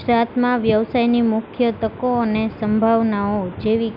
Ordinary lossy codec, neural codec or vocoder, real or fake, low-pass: none; none; real; 5.4 kHz